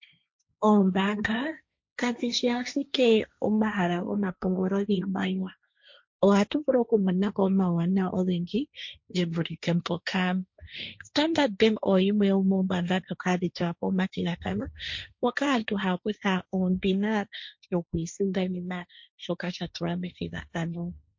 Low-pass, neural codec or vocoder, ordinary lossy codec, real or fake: 7.2 kHz; codec, 16 kHz, 1.1 kbps, Voila-Tokenizer; MP3, 48 kbps; fake